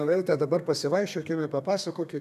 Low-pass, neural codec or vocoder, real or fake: 14.4 kHz; codec, 32 kHz, 1.9 kbps, SNAC; fake